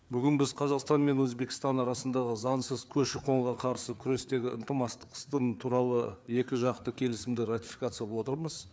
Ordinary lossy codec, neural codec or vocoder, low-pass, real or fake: none; codec, 16 kHz, 4 kbps, FreqCodec, larger model; none; fake